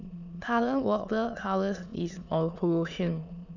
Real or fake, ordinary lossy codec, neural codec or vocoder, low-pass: fake; none; autoencoder, 22.05 kHz, a latent of 192 numbers a frame, VITS, trained on many speakers; 7.2 kHz